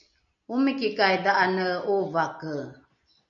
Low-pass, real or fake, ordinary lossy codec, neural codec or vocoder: 7.2 kHz; real; MP3, 96 kbps; none